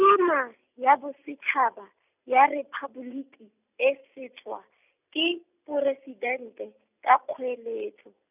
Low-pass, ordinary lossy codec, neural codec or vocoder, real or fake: 3.6 kHz; none; none; real